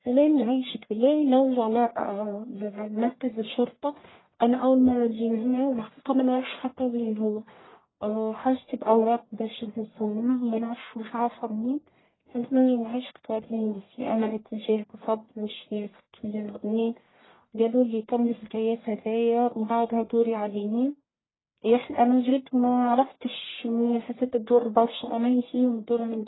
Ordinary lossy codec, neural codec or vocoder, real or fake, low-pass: AAC, 16 kbps; codec, 44.1 kHz, 1.7 kbps, Pupu-Codec; fake; 7.2 kHz